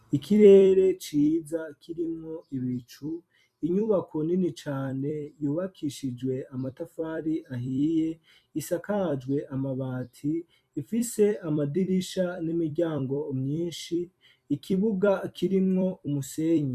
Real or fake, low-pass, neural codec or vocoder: fake; 14.4 kHz; vocoder, 44.1 kHz, 128 mel bands every 256 samples, BigVGAN v2